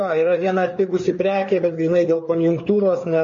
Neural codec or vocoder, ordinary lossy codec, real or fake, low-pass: codec, 16 kHz, 4 kbps, FreqCodec, larger model; MP3, 32 kbps; fake; 7.2 kHz